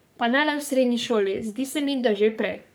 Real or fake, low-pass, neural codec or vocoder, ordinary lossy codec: fake; none; codec, 44.1 kHz, 3.4 kbps, Pupu-Codec; none